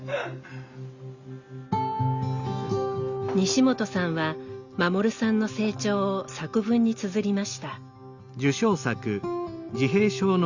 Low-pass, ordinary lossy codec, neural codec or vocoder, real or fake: 7.2 kHz; Opus, 64 kbps; none; real